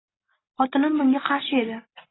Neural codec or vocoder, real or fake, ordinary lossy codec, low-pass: none; real; AAC, 16 kbps; 7.2 kHz